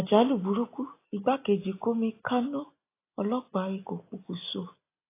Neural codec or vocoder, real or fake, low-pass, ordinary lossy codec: none; real; 3.6 kHz; AAC, 24 kbps